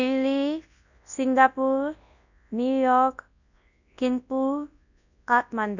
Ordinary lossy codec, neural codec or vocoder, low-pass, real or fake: none; codec, 24 kHz, 0.9 kbps, WavTokenizer, large speech release; 7.2 kHz; fake